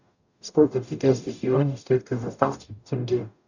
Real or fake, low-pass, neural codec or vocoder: fake; 7.2 kHz; codec, 44.1 kHz, 0.9 kbps, DAC